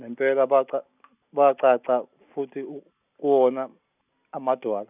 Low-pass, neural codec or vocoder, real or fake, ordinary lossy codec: 3.6 kHz; none; real; none